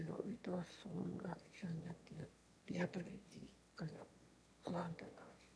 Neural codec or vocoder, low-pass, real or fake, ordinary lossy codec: autoencoder, 22.05 kHz, a latent of 192 numbers a frame, VITS, trained on one speaker; none; fake; none